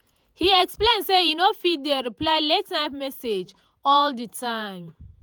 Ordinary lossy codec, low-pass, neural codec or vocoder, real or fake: none; none; vocoder, 48 kHz, 128 mel bands, Vocos; fake